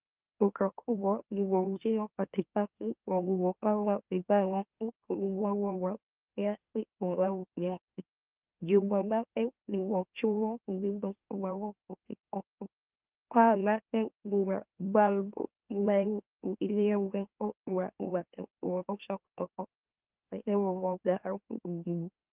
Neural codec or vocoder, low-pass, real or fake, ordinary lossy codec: autoencoder, 44.1 kHz, a latent of 192 numbers a frame, MeloTTS; 3.6 kHz; fake; Opus, 24 kbps